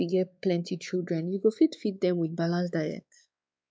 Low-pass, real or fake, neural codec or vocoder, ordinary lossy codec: none; fake; codec, 16 kHz, 4 kbps, X-Codec, WavLM features, trained on Multilingual LibriSpeech; none